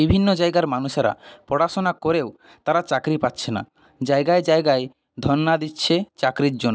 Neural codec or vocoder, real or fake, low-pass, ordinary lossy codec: none; real; none; none